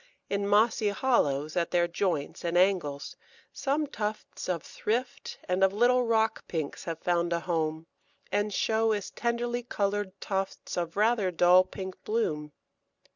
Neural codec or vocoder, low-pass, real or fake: none; 7.2 kHz; real